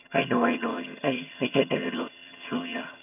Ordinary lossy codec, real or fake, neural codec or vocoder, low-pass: none; fake; vocoder, 22.05 kHz, 80 mel bands, HiFi-GAN; 3.6 kHz